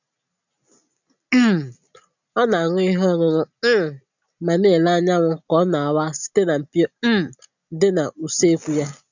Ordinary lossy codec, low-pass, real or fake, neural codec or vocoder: none; 7.2 kHz; real; none